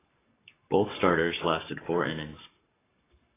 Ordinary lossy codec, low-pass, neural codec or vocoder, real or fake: AAC, 16 kbps; 3.6 kHz; codec, 44.1 kHz, 7.8 kbps, Pupu-Codec; fake